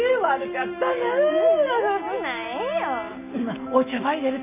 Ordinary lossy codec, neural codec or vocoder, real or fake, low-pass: none; none; real; 3.6 kHz